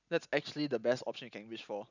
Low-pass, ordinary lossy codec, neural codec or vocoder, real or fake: 7.2 kHz; none; none; real